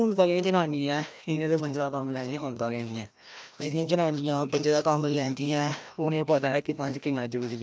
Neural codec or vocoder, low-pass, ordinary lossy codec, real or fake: codec, 16 kHz, 1 kbps, FreqCodec, larger model; none; none; fake